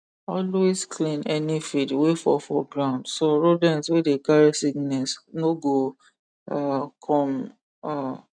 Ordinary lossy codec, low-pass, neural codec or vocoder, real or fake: none; 9.9 kHz; none; real